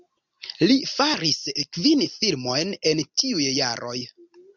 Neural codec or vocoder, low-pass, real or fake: none; 7.2 kHz; real